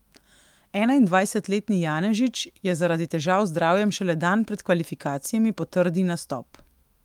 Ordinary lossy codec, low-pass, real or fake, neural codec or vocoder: Opus, 32 kbps; 19.8 kHz; fake; autoencoder, 48 kHz, 128 numbers a frame, DAC-VAE, trained on Japanese speech